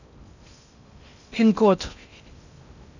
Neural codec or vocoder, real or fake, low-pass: codec, 16 kHz in and 24 kHz out, 0.6 kbps, FocalCodec, streaming, 2048 codes; fake; 7.2 kHz